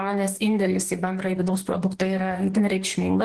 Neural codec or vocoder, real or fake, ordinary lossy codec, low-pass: codec, 44.1 kHz, 2.6 kbps, DAC; fake; Opus, 16 kbps; 10.8 kHz